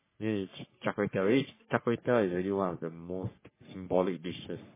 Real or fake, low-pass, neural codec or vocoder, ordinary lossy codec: fake; 3.6 kHz; codec, 44.1 kHz, 3.4 kbps, Pupu-Codec; MP3, 16 kbps